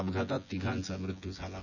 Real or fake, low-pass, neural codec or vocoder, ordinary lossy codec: fake; 7.2 kHz; vocoder, 24 kHz, 100 mel bands, Vocos; none